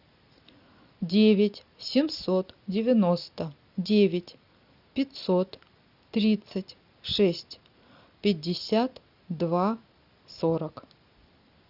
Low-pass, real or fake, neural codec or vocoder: 5.4 kHz; real; none